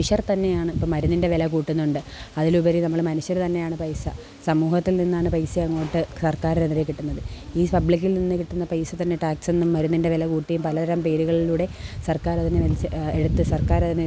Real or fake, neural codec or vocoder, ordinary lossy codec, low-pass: real; none; none; none